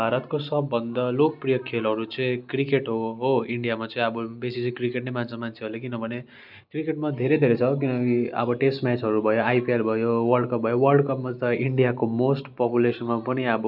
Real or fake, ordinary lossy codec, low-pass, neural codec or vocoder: real; none; 5.4 kHz; none